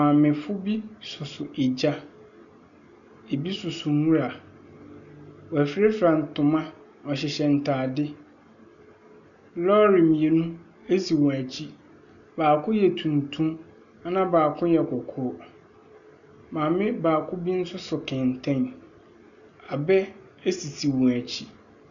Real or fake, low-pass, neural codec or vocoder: real; 7.2 kHz; none